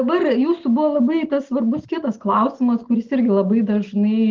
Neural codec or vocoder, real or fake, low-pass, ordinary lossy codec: none; real; 7.2 kHz; Opus, 16 kbps